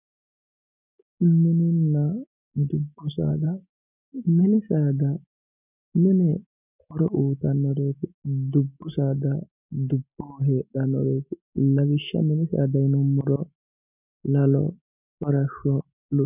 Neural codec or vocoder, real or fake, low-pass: none; real; 3.6 kHz